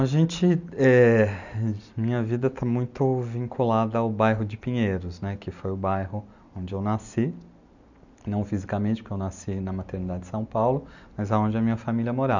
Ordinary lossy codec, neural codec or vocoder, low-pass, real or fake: none; none; 7.2 kHz; real